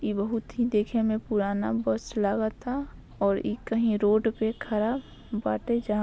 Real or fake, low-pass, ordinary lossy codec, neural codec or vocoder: real; none; none; none